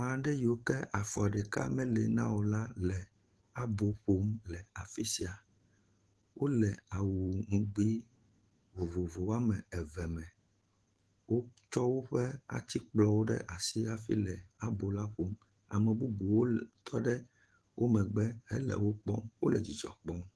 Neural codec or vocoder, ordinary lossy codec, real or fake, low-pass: autoencoder, 48 kHz, 128 numbers a frame, DAC-VAE, trained on Japanese speech; Opus, 16 kbps; fake; 10.8 kHz